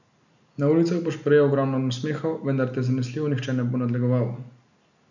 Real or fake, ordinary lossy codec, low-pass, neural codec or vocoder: real; none; 7.2 kHz; none